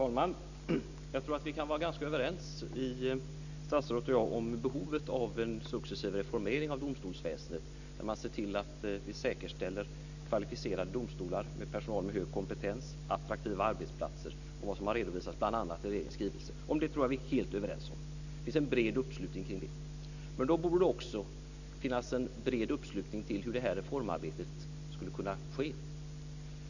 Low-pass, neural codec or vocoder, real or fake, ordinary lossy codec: 7.2 kHz; none; real; none